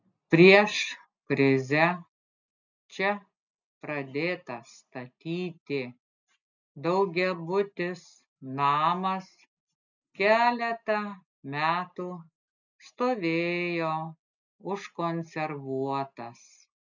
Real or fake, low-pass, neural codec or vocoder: real; 7.2 kHz; none